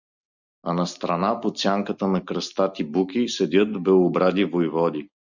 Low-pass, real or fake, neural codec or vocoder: 7.2 kHz; real; none